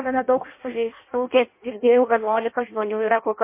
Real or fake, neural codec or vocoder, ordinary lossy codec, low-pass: fake; codec, 16 kHz in and 24 kHz out, 0.6 kbps, FireRedTTS-2 codec; MP3, 24 kbps; 3.6 kHz